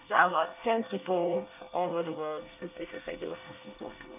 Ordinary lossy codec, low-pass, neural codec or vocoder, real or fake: none; 3.6 kHz; codec, 24 kHz, 1 kbps, SNAC; fake